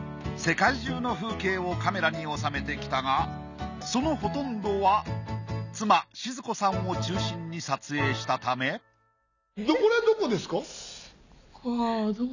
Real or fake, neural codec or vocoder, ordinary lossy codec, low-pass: real; none; none; 7.2 kHz